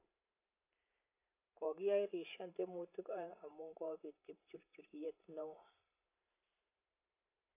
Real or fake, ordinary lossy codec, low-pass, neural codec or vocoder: fake; AAC, 32 kbps; 3.6 kHz; vocoder, 44.1 kHz, 128 mel bands every 512 samples, BigVGAN v2